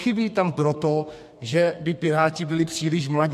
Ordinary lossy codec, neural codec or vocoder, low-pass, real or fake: MP3, 64 kbps; codec, 32 kHz, 1.9 kbps, SNAC; 14.4 kHz; fake